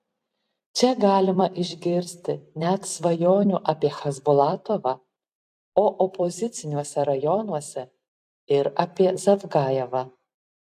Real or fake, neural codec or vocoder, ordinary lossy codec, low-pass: fake; vocoder, 44.1 kHz, 128 mel bands every 256 samples, BigVGAN v2; AAC, 96 kbps; 14.4 kHz